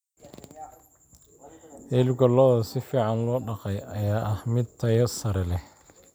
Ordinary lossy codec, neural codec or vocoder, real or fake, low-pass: none; none; real; none